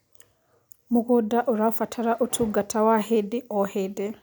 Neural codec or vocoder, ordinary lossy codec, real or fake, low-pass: none; none; real; none